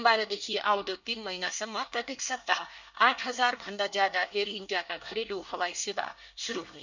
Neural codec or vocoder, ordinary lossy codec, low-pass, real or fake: codec, 24 kHz, 1 kbps, SNAC; none; 7.2 kHz; fake